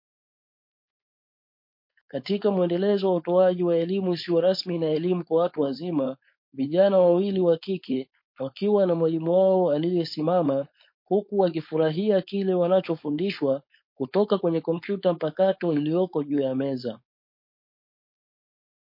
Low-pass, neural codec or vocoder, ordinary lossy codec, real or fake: 5.4 kHz; codec, 16 kHz, 4.8 kbps, FACodec; MP3, 32 kbps; fake